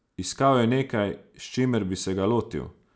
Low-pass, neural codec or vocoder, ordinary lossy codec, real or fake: none; none; none; real